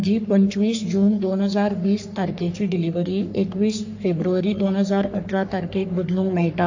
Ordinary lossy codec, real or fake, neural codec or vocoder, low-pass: AAC, 48 kbps; fake; codec, 44.1 kHz, 3.4 kbps, Pupu-Codec; 7.2 kHz